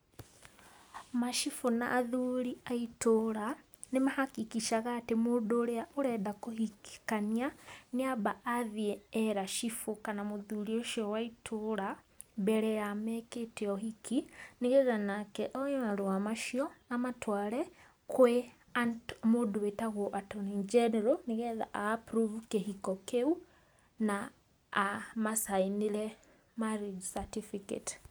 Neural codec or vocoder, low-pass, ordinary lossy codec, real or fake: none; none; none; real